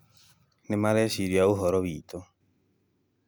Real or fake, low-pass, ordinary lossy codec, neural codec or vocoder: real; none; none; none